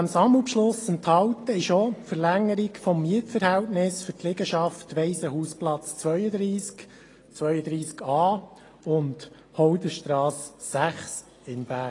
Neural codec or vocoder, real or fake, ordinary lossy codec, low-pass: none; real; AAC, 32 kbps; 10.8 kHz